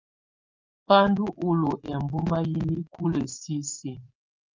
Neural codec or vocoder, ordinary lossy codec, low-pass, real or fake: vocoder, 24 kHz, 100 mel bands, Vocos; Opus, 32 kbps; 7.2 kHz; fake